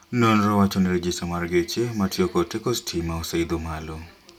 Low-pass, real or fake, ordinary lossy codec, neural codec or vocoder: 19.8 kHz; real; none; none